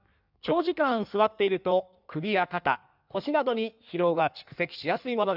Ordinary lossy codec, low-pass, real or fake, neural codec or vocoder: none; 5.4 kHz; fake; codec, 16 kHz in and 24 kHz out, 1.1 kbps, FireRedTTS-2 codec